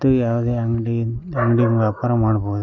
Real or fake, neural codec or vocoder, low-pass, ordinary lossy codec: real; none; 7.2 kHz; none